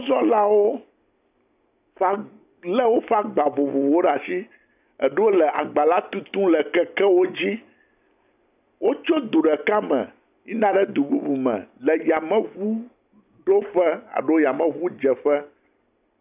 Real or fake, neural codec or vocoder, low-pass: real; none; 3.6 kHz